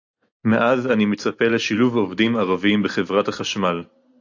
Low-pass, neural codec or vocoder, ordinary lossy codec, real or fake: 7.2 kHz; none; AAC, 48 kbps; real